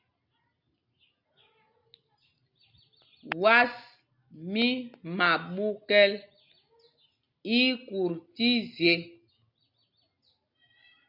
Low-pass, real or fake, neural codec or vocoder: 5.4 kHz; real; none